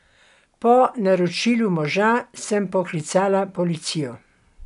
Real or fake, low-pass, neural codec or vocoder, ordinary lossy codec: real; 10.8 kHz; none; none